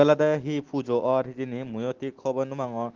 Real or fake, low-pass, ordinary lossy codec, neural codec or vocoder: real; 7.2 kHz; Opus, 16 kbps; none